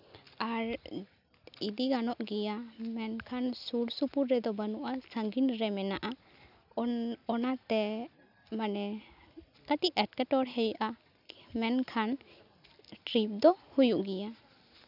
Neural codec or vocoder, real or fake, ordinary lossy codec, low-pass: none; real; none; 5.4 kHz